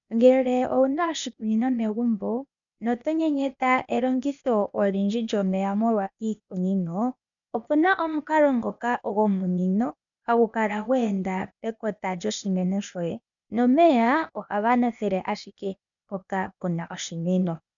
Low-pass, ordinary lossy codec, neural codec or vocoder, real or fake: 7.2 kHz; MP3, 96 kbps; codec, 16 kHz, 0.8 kbps, ZipCodec; fake